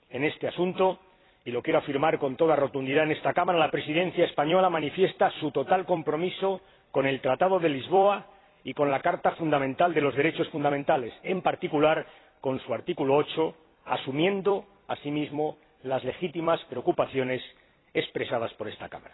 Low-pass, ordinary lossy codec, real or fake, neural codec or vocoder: 7.2 kHz; AAC, 16 kbps; fake; vocoder, 44.1 kHz, 128 mel bands every 256 samples, BigVGAN v2